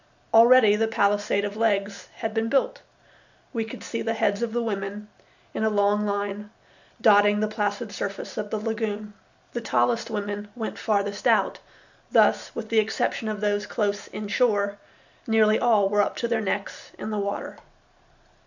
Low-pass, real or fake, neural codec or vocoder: 7.2 kHz; real; none